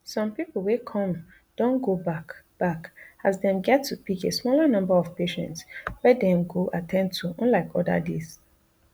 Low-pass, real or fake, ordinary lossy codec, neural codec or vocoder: none; real; none; none